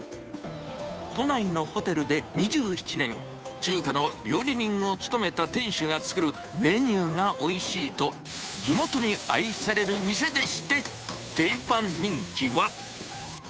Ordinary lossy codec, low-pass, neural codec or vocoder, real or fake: none; none; codec, 16 kHz, 2 kbps, FunCodec, trained on Chinese and English, 25 frames a second; fake